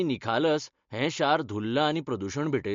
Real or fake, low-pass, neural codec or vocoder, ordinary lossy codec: real; 7.2 kHz; none; MP3, 48 kbps